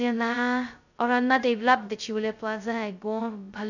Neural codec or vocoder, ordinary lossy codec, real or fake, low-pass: codec, 16 kHz, 0.2 kbps, FocalCodec; none; fake; 7.2 kHz